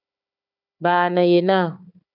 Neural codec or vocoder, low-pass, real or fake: codec, 16 kHz, 4 kbps, FunCodec, trained on Chinese and English, 50 frames a second; 5.4 kHz; fake